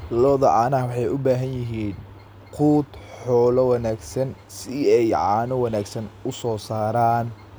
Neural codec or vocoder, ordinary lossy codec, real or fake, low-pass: none; none; real; none